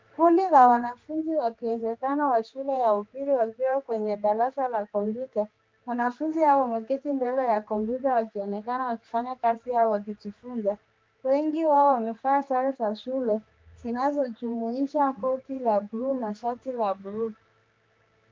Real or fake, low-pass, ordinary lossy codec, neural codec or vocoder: fake; 7.2 kHz; Opus, 32 kbps; codec, 16 kHz, 2 kbps, X-Codec, HuBERT features, trained on general audio